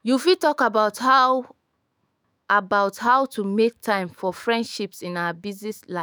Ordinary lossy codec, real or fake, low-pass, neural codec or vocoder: none; fake; none; autoencoder, 48 kHz, 128 numbers a frame, DAC-VAE, trained on Japanese speech